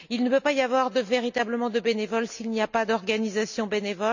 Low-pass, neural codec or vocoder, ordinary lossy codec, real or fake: 7.2 kHz; none; none; real